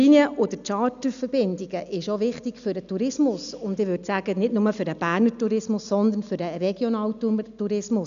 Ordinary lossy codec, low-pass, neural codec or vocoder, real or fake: none; 7.2 kHz; none; real